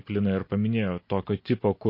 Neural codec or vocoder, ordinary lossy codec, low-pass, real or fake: none; MP3, 32 kbps; 5.4 kHz; real